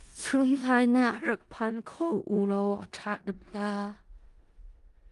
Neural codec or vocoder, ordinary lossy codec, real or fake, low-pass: codec, 16 kHz in and 24 kHz out, 0.4 kbps, LongCat-Audio-Codec, four codebook decoder; Opus, 32 kbps; fake; 10.8 kHz